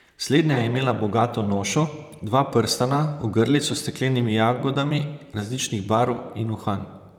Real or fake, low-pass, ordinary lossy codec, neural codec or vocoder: fake; 19.8 kHz; none; vocoder, 44.1 kHz, 128 mel bands, Pupu-Vocoder